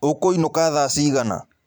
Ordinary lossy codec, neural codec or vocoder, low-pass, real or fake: none; vocoder, 44.1 kHz, 128 mel bands every 512 samples, BigVGAN v2; none; fake